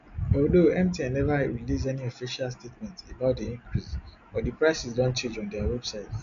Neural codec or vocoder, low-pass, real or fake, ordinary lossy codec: none; 7.2 kHz; real; none